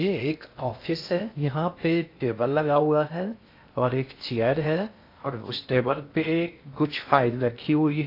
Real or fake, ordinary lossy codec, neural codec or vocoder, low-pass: fake; AAC, 32 kbps; codec, 16 kHz in and 24 kHz out, 0.6 kbps, FocalCodec, streaming, 2048 codes; 5.4 kHz